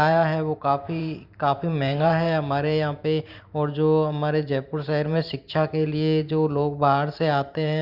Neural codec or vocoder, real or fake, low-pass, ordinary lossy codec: vocoder, 44.1 kHz, 128 mel bands every 512 samples, BigVGAN v2; fake; 5.4 kHz; Opus, 64 kbps